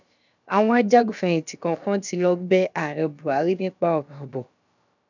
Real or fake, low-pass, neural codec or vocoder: fake; 7.2 kHz; codec, 16 kHz, about 1 kbps, DyCAST, with the encoder's durations